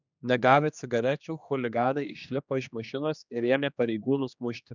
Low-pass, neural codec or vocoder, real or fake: 7.2 kHz; codec, 16 kHz, 2 kbps, X-Codec, HuBERT features, trained on general audio; fake